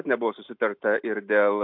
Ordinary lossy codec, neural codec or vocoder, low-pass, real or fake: MP3, 48 kbps; none; 5.4 kHz; real